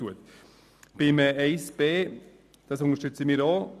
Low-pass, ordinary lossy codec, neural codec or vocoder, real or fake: 14.4 kHz; none; none; real